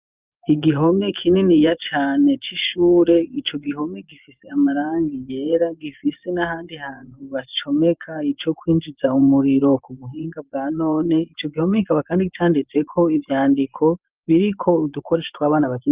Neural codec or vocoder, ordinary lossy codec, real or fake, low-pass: vocoder, 24 kHz, 100 mel bands, Vocos; Opus, 24 kbps; fake; 3.6 kHz